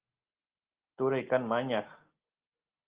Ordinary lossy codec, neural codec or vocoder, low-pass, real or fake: Opus, 16 kbps; none; 3.6 kHz; real